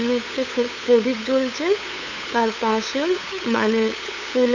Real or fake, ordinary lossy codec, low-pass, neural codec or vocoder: fake; none; 7.2 kHz; codec, 16 kHz, 8 kbps, FunCodec, trained on LibriTTS, 25 frames a second